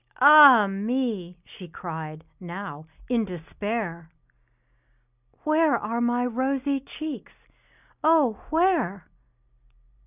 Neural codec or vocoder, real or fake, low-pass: none; real; 3.6 kHz